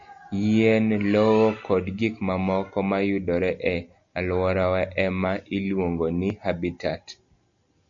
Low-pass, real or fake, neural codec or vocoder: 7.2 kHz; real; none